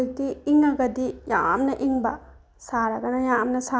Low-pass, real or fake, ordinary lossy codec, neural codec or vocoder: none; real; none; none